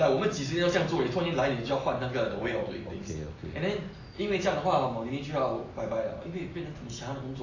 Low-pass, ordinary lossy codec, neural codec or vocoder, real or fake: 7.2 kHz; AAC, 32 kbps; none; real